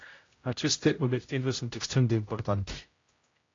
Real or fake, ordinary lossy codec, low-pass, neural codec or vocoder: fake; AAC, 32 kbps; 7.2 kHz; codec, 16 kHz, 0.5 kbps, X-Codec, HuBERT features, trained on general audio